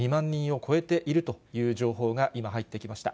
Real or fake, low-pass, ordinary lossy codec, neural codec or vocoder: real; none; none; none